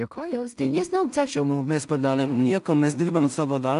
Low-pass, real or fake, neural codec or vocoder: 10.8 kHz; fake; codec, 16 kHz in and 24 kHz out, 0.4 kbps, LongCat-Audio-Codec, two codebook decoder